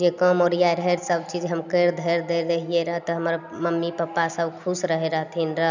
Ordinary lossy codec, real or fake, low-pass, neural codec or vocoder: none; real; 7.2 kHz; none